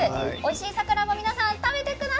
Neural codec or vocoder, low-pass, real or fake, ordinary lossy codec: none; none; real; none